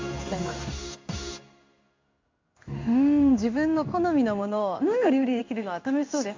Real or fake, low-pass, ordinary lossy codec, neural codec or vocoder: fake; 7.2 kHz; MP3, 64 kbps; codec, 16 kHz in and 24 kHz out, 1 kbps, XY-Tokenizer